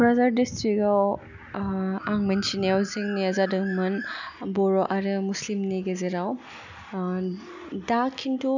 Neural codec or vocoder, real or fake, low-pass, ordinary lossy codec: none; real; 7.2 kHz; none